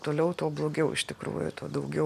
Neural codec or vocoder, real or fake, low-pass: none; real; 14.4 kHz